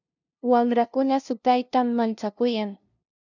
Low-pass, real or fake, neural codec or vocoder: 7.2 kHz; fake; codec, 16 kHz, 0.5 kbps, FunCodec, trained on LibriTTS, 25 frames a second